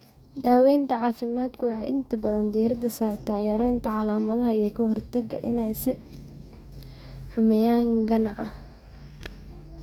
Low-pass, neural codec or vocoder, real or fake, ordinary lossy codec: 19.8 kHz; codec, 44.1 kHz, 2.6 kbps, DAC; fake; none